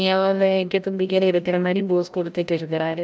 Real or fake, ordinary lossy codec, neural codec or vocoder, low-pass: fake; none; codec, 16 kHz, 0.5 kbps, FreqCodec, larger model; none